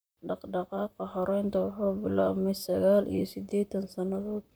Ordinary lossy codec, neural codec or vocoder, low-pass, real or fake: none; vocoder, 44.1 kHz, 128 mel bands, Pupu-Vocoder; none; fake